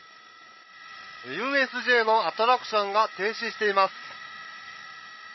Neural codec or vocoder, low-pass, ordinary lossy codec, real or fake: codec, 16 kHz, 16 kbps, FreqCodec, larger model; 7.2 kHz; MP3, 24 kbps; fake